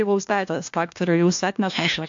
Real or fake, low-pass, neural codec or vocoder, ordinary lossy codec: fake; 7.2 kHz; codec, 16 kHz, 1 kbps, FunCodec, trained on LibriTTS, 50 frames a second; AAC, 64 kbps